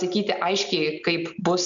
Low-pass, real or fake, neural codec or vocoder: 7.2 kHz; real; none